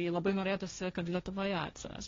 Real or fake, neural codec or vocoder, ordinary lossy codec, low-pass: fake; codec, 16 kHz, 1.1 kbps, Voila-Tokenizer; AAC, 32 kbps; 7.2 kHz